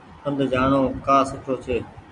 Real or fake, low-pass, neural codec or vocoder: real; 10.8 kHz; none